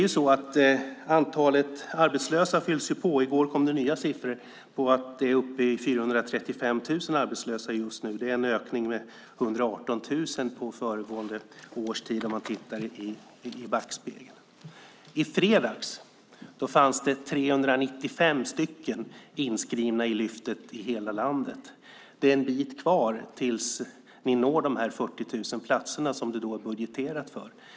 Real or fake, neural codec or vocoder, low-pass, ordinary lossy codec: real; none; none; none